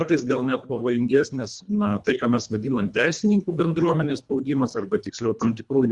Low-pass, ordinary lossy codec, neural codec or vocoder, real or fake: 10.8 kHz; Opus, 64 kbps; codec, 24 kHz, 1.5 kbps, HILCodec; fake